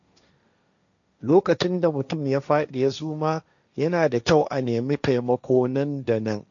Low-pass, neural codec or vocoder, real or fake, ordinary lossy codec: 7.2 kHz; codec, 16 kHz, 1.1 kbps, Voila-Tokenizer; fake; none